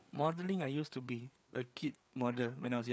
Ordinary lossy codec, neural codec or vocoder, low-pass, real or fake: none; codec, 16 kHz, 4 kbps, FreqCodec, larger model; none; fake